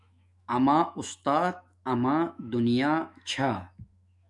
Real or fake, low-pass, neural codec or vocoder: fake; 10.8 kHz; autoencoder, 48 kHz, 128 numbers a frame, DAC-VAE, trained on Japanese speech